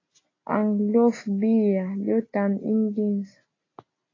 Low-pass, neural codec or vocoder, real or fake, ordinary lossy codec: 7.2 kHz; none; real; AAC, 32 kbps